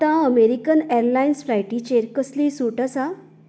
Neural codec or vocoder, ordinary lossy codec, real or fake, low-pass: none; none; real; none